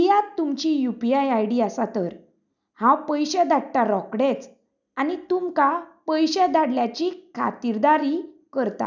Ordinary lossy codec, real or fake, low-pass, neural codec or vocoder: none; real; 7.2 kHz; none